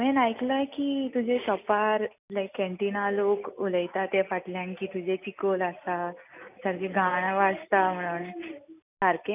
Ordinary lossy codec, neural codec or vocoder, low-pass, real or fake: none; none; 3.6 kHz; real